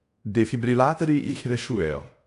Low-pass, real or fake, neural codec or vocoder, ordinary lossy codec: 10.8 kHz; fake; codec, 24 kHz, 0.5 kbps, DualCodec; AAC, 64 kbps